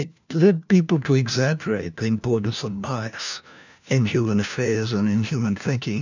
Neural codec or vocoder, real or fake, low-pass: codec, 16 kHz, 1 kbps, FunCodec, trained on LibriTTS, 50 frames a second; fake; 7.2 kHz